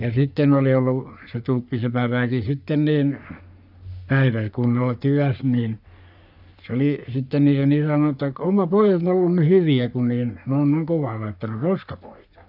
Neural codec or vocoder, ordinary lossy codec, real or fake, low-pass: codec, 44.1 kHz, 3.4 kbps, Pupu-Codec; none; fake; 5.4 kHz